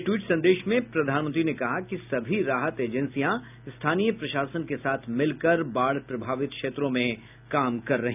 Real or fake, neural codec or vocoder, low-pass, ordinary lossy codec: real; none; 3.6 kHz; none